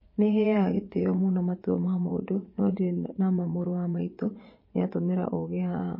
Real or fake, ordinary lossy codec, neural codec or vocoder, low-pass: fake; MP3, 24 kbps; vocoder, 22.05 kHz, 80 mel bands, Vocos; 5.4 kHz